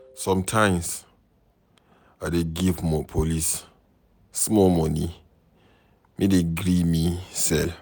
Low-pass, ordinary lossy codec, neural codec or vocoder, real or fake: none; none; none; real